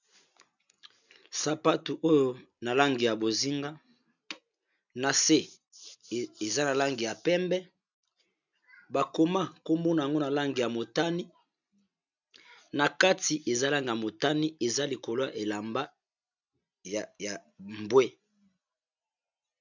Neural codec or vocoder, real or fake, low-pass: none; real; 7.2 kHz